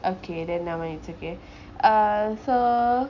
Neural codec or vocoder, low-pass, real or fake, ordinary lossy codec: none; 7.2 kHz; real; none